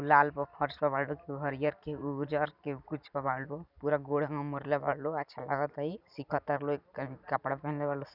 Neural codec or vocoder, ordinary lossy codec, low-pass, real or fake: none; none; 5.4 kHz; real